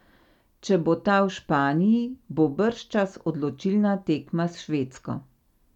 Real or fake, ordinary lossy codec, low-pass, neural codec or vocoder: real; none; 19.8 kHz; none